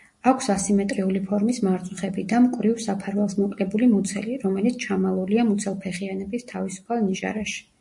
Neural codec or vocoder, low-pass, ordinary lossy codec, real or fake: none; 10.8 kHz; MP3, 48 kbps; real